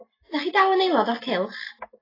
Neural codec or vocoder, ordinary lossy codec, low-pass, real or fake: none; AAC, 24 kbps; 5.4 kHz; real